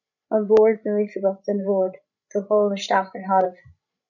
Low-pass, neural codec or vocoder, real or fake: 7.2 kHz; codec, 16 kHz, 16 kbps, FreqCodec, larger model; fake